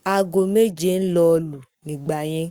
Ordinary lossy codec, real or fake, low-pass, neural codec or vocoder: Opus, 64 kbps; fake; 19.8 kHz; codec, 44.1 kHz, 7.8 kbps, Pupu-Codec